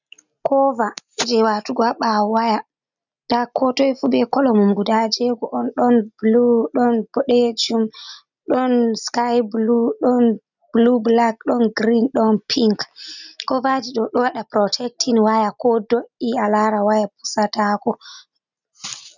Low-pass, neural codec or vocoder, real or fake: 7.2 kHz; none; real